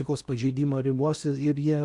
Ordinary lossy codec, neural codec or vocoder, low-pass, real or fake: Opus, 64 kbps; codec, 16 kHz in and 24 kHz out, 0.8 kbps, FocalCodec, streaming, 65536 codes; 10.8 kHz; fake